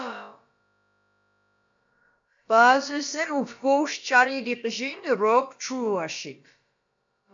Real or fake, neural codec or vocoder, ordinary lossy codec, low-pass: fake; codec, 16 kHz, about 1 kbps, DyCAST, with the encoder's durations; AAC, 64 kbps; 7.2 kHz